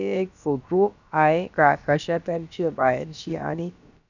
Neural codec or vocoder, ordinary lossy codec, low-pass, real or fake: codec, 16 kHz, about 1 kbps, DyCAST, with the encoder's durations; none; 7.2 kHz; fake